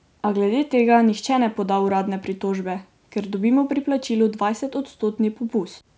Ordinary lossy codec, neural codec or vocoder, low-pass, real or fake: none; none; none; real